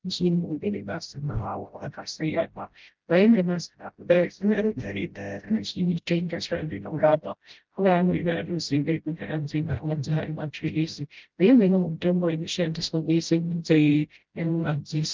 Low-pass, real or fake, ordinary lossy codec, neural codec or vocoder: 7.2 kHz; fake; Opus, 32 kbps; codec, 16 kHz, 0.5 kbps, FreqCodec, smaller model